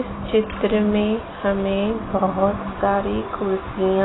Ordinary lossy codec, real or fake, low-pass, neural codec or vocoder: AAC, 16 kbps; real; 7.2 kHz; none